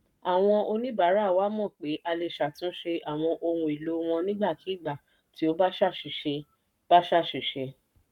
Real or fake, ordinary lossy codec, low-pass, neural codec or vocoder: fake; none; 19.8 kHz; codec, 44.1 kHz, 7.8 kbps, Pupu-Codec